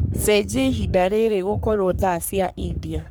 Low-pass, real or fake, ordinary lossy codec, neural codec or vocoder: none; fake; none; codec, 44.1 kHz, 3.4 kbps, Pupu-Codec